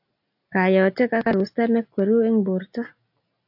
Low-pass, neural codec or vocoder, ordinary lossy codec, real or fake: 5.4 kHz; none; AAC, 48 kbps; real